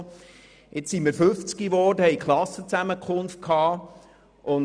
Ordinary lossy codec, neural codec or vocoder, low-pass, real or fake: none; none; 9.9 kHz; real